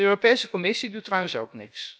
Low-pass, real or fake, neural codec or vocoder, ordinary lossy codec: none; fake; codec, 16 kHz, about 1 kbps, DyCAST, with the encoder's durations; none